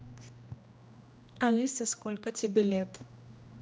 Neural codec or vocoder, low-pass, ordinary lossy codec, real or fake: codec, 16 kHz, 1 kbps, X-Codec, HuBERT features, trained on general audio; none; none; fake